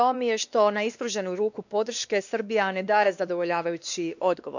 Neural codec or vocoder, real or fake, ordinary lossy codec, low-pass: codec, 16 kHz, 2 kbps, X-Codec, WavLM features, trained on Multilingual LibriSpeech; fake; none; 7.2 kHz